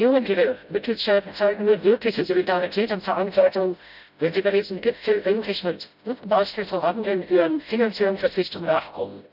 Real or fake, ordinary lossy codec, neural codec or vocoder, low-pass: fake; none; codec, 16 kHz, 0.5 kbps, FreqCodec, smaller model; 5.4 kHz